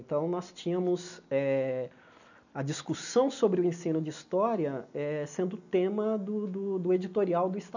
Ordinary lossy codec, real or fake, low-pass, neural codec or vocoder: none; real; 7.2 kHz; none